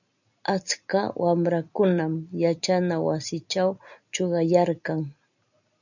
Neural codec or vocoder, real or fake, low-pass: none; real; 7.2 kHz